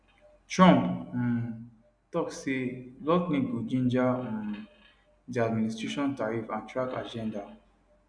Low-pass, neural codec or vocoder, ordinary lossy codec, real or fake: 9.9 kHz; none; none; real